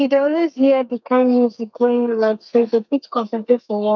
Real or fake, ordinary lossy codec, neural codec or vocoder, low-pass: fake; none; codec, 32 kHz, 1.9 kbps, SNAC; 7.2 kHz